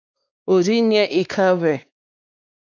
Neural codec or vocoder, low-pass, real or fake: codec, 16 kHz, 2 kbps, X-Codec, WavLM features, trained on Multilingual LibriSpeech; 7.2 kHz; fake